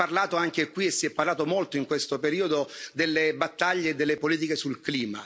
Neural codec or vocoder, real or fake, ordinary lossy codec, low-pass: none; real; none; none